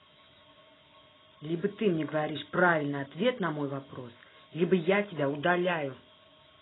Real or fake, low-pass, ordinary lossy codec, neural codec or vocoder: real; 7.2 kHz; AAC, 16 kbps; none